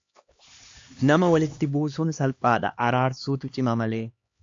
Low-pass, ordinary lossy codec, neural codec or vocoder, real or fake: 7.2 kHz; AAC, 48 kbps; codec, 16 kHz, 2 kbps, X-Codec, HuBERT features, trained on LibriSpeech; fake